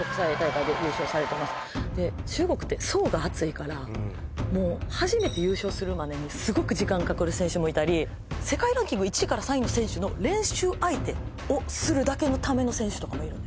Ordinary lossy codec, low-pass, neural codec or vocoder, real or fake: none; none; none; real